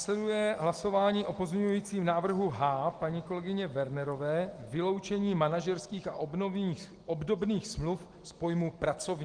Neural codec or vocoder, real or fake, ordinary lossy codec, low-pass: none; real; Opus, 24 kbps; 9.9 kHz